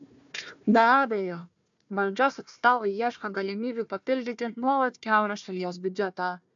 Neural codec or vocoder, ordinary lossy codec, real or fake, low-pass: codec, 16 kHz, 1 kbps, FunCodec, trained on Chinese and English, 50 frames a second; AAC, 64 kbps; fake; 7.2 kHz